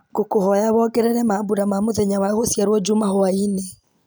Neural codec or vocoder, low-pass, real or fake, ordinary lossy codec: none; none; real; none